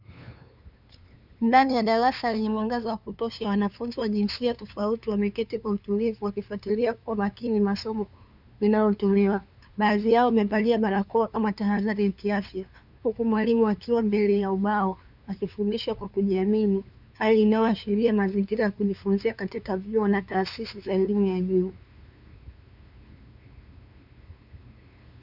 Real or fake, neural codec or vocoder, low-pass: fake; codec, 16 kHz, 2 kbps, FunCodec, trained on LibriTTS, 25 frames a second; 5.4 kHz